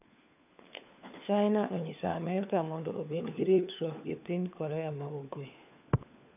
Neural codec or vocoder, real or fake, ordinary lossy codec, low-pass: codec, 16 kHz, 4 kbps, FunCodec, trained on LibriTTS, 50 frames a second; fake; none; 3.6 kHz